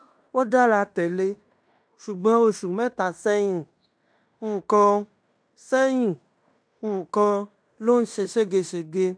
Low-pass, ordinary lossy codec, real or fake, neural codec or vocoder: 9.9 kHz; none; fake; codec, 16 kHz in and 24 kHz out, 0.9 kbps, LongCat-Audio-Codec, fine tuned four codebook decoder